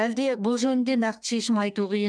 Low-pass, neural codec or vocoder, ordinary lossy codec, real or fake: 9.9 kHz; codec, 32 kHz, 1.9 kbps, SNAC; none; fake